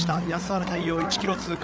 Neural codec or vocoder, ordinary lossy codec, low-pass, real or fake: codec, 16 kHz, 8 kbps, FreqCodec, larger model; none; none; fake